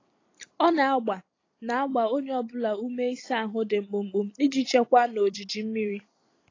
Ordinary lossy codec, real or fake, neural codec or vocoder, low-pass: AAC, 32 kbps; fake; vocoder, 44.1 kHz, 128 mel bands every 256 samples, BigVGAN v2; 7.2 kHz